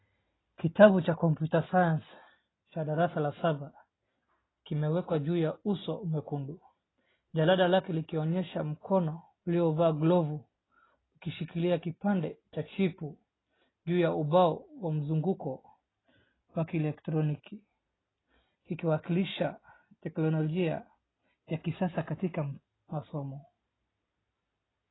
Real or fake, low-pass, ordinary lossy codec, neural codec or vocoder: real; 7.2 kHz; AAC, 16 kbps; none